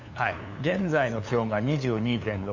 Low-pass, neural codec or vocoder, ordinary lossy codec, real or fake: 7.2 kHz; codec, 16 kHz, 2 kbps, FunCodec, trained on LibriTTS, 25 frames a second; none; fake